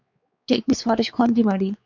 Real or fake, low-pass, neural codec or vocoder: fake; 7.2 kHz; codec, 16 kHz, 4 kbps, X-Codec, WavLM features, trained on Multilingual LibriSpeech